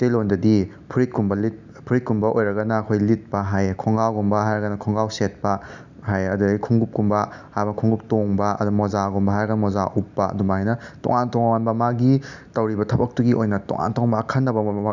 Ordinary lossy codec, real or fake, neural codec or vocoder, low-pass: none; real; none; 7.2 kHz